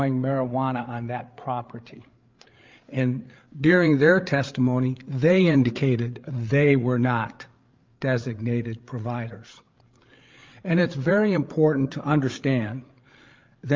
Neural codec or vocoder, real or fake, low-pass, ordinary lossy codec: codec, 16 kHz, 8 kbps, FreqCodec, larger model; fake; 7.2 kHz; Opus, 24 kbps